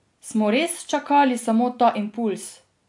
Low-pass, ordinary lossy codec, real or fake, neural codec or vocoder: 10.8 kHz; AAC, 64 kbps; real; none